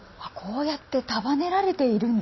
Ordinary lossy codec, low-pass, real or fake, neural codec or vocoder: MP3, 24 kbps; 7.2 kHz; real; none